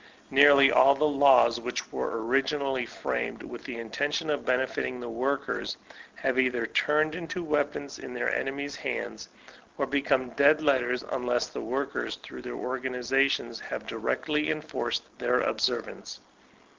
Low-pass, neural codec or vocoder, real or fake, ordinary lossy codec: 7.2 kHz; none; real; Opus, 32 kbps